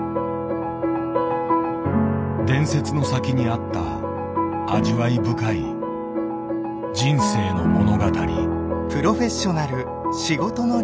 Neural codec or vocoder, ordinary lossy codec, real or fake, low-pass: none; none; real; none